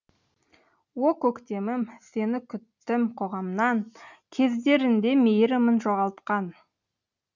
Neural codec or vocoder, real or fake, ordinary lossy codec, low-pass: none; real; none; 7.2 kHz